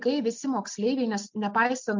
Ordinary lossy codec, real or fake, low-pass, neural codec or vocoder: MP3, 64 kbps; real; 7.2 kHz; none